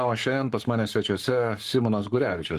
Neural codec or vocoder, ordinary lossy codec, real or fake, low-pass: codec, 44.1 kHz, 7.8 kbps, Pupu-Codec; Opus, 24 kbps; fake; 14.4 kHz